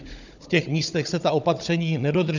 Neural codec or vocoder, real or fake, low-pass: codec, 16 kHz, 16 kbps, FunCodec, trained on Chinese and English, 50 frames a second; fake; 7.2 kHz